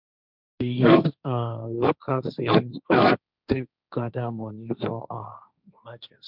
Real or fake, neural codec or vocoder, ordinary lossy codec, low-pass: fake; codec, 16 kHz, 1.1 kbps, Voila-Tokenizer; none; 5.4 kHz